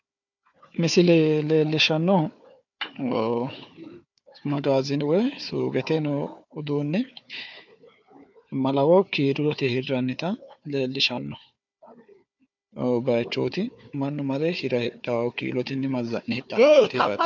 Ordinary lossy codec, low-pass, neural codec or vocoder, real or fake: MP3, 64 kbps; 7.2 kHz; codec, 16 kHz, 4 kbps, FunCodec, trained on Chinese and English, 50 frames a second; fake